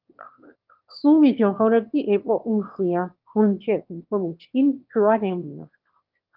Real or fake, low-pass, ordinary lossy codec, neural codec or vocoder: fake; 5.4 kHz; Opus, 32 kbps; autoencoder, 22.05 kHz, a latent of 192 numbers a frame, VITS, trained on one speaker